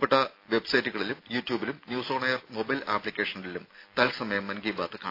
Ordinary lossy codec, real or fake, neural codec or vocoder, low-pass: AAC, 32 kbps; real; none; 5.4 kHz